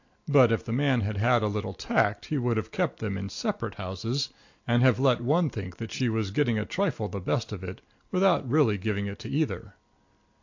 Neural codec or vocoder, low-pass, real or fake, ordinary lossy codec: none; 7.2 kHz; real; AAC, 48 kbps